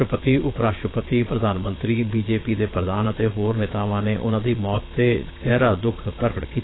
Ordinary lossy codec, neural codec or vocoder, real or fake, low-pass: AAC, 16 kbps; vocoder, 22.05 kHz, 80 mel bands, Vocos; fake; 7.2 kHz